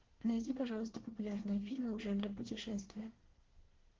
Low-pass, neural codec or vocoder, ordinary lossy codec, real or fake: 7.2 kHz; codec, 24 kHz, 1 kbps, SNAC; Opus, 16 kbps; fake